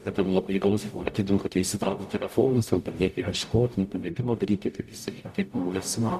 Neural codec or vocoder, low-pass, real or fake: codec, 44.1 kHz, 0.9 kbps, DAC; 14.4 kHz; fake